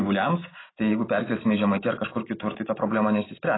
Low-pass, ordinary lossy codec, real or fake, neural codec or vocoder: 7.2 kHz; AAC, 16 kbps; real; none